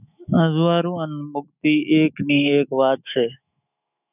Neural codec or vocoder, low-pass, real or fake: autoencoder, 48 kHz, 128 numbers a frame, DAC-VAE, trained on Japanese speech; 3.6 kHz; fake